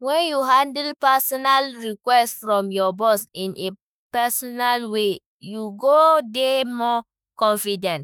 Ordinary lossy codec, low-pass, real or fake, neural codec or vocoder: none; none; fake; autoencoder, 48 kHz, 32 numbers a frame, DAC-VAE, trained on Japanese speech